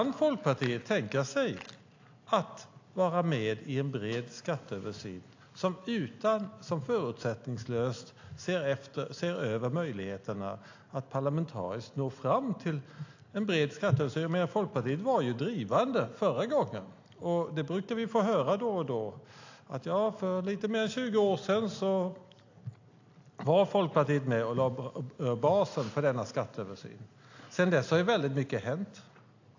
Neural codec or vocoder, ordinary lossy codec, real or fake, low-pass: none; AAC, 48 kbps; real; 7.2 kHz